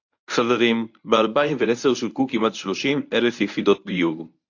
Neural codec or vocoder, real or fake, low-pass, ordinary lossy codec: codec, 24 kHz, 0.9 kbps, WavTokenizer, medium speech release version 1; fake; 7.2 kHz; AAC, 48 kbps